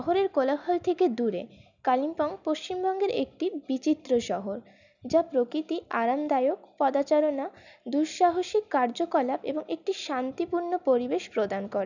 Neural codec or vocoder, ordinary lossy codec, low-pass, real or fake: none; none; 7.2 kHz; real